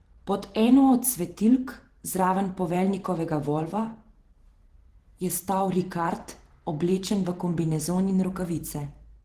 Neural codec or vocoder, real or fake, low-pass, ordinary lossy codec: vocoder, 44.1 kHz, 128 mel bands every 512 samples, BigVGAN v2; fake; 14.4 kHz; Opus, 16 kbps